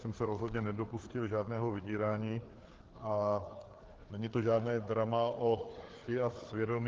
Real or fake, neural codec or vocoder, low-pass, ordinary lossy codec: fake; codec, 16 kHz, 4 kbps, FreqCodec, larger model; 7.2 kHz; Opus, 16 kbps